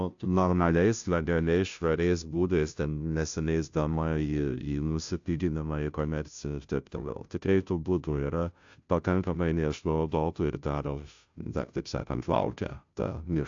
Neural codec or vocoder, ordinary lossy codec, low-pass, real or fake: codec, 16 kHz, 0.5 kbps, FunCodec, trained on Chinese and English, 25 frames a second; AAC, 64 kbps; 7.2 kHz; fake